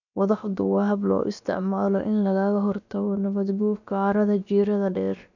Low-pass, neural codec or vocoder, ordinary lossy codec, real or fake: 7.2 kHz; codec, 16 kHz, about 1 kbps, DyCAST, with the encoder's durations; none; fake